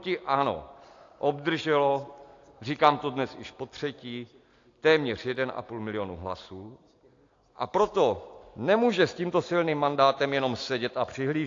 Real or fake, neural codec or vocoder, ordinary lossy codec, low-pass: real; none; AAC, 48 kbps; 7.2 kHz